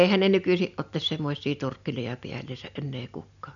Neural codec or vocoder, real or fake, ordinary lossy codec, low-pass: none; real; none; 7.2 kHz